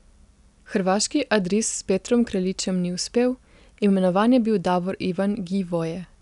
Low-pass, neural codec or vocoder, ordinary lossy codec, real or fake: 10.8 kHz; none; none; real